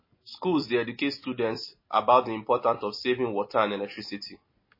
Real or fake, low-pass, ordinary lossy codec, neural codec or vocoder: real; 5.4 kHz; MP3, 24 kbps; none